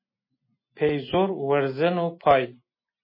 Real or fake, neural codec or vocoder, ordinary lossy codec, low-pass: real; none; MP3, 24 kbps; 5.4 kHz